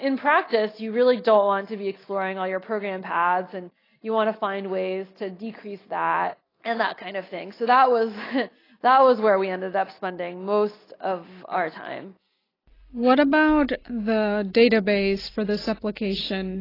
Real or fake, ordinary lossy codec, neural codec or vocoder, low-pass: real; AAC, 24 kbps; none; 5.4 kHz